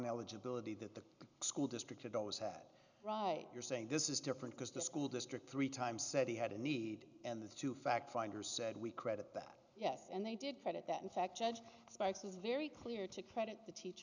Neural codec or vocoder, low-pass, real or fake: none; 7.2 kHz; real